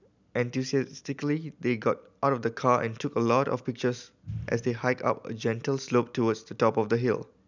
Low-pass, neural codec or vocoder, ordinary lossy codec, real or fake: 7.2 kHz; none; none; real